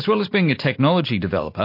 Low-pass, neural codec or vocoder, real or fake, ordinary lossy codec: 5.4 kHz; none; real; MP3, 32 kbps